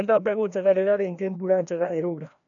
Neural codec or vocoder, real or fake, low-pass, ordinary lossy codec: codec, 16 kHz, 1 kbps, FreqCodec, larger model; fake; 7.2 kHz; none